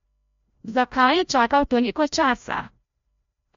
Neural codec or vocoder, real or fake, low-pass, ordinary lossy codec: codec, 16 kHz, 0.5 kbps, FreqCodec, larger model; fake; 7.2 kHz; MP3, 48 kbps